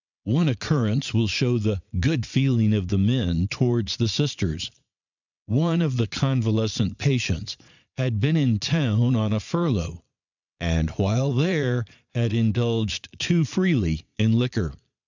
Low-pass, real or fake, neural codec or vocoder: 7.2 kHz; fake; vocoder, 22.05 kHz, 80 mel bands, Vocos